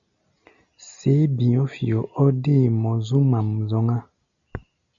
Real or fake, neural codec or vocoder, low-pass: real; none; 7.2 kHz